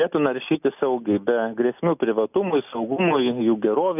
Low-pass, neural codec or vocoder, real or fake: 3.6 kHz; none; real